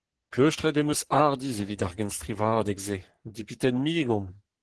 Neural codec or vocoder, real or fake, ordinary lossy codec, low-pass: codec, 44.1 kHz, 3.4 kbps, Pupu-Codec; fake; Opus, 16 kbps; 10.8 kHz